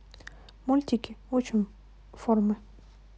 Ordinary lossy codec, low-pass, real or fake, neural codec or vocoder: none; none; real; none